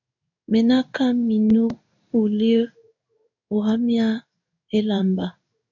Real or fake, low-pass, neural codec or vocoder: fake; 7.2 kHz; codec, 16 kHz in and 24 kHz out, 1 kbps, XY-Tokenizer